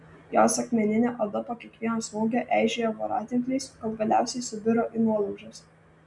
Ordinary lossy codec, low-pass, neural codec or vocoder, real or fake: MP3, 96 kbps; 10.8 kHz; none; real